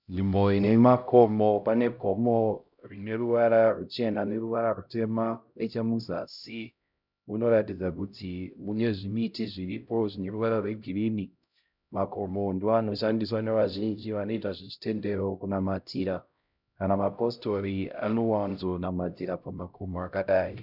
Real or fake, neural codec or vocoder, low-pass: fake; codec, 16 kHz, 0.5 kbps, X-Codec, HuBERT features, trained on LibriSpeech; 5.4 kHz